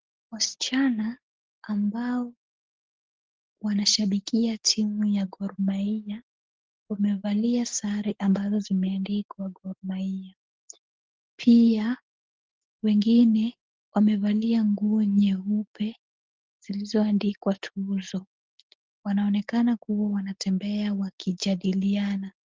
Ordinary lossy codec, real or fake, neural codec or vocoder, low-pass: Opus, 16 kbps; real; none; 7.2 kHz